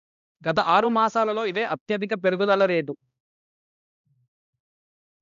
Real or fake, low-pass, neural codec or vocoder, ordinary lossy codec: fake; 7.2 kHz; codec, 16 kHz, 1 kbps, X-Codec, HuBERT features, trained on balanced general audio; none